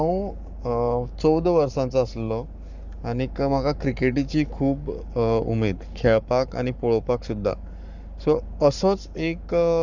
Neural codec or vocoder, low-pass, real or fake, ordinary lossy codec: codec, 44.1 kHz, 7.8 kbps, Pupu-Codec; 7.2 kHz; fake; none